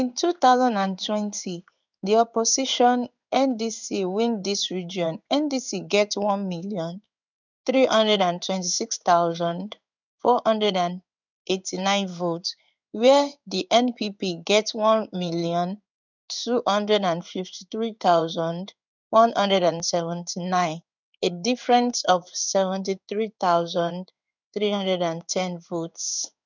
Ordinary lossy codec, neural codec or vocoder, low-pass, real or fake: none; codec, 16 kHz in and 24 kHz out, 1 kbps, XY-Tokenizer; 7.2 kHz; fake